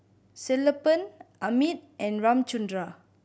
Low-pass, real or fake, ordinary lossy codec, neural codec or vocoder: none; real; none; none